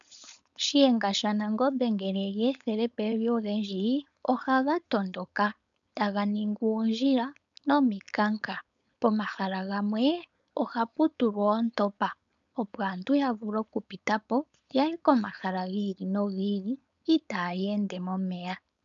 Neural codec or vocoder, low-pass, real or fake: codec, 16 kHz, 4.8 kbps, FACodec; 7.2 kHz; fake